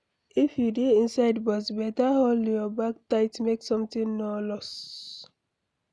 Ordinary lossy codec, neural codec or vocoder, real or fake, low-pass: none; none; real; none